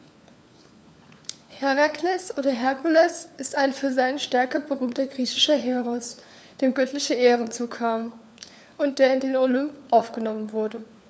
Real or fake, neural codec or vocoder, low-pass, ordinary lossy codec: fake; codec, 16 kHz, 4 kbps, FunCodec, trained on LibriTTS, 50 frames a second; none; none